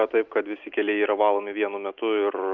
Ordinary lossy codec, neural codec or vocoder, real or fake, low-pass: Opus, 24 kbps; none; real; 7.2 kHz